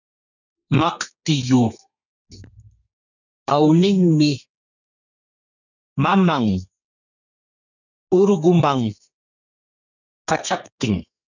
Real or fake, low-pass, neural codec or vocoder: fake; 7.2 kHz; codec, 32 kHz, 1.9 kbps, SNAC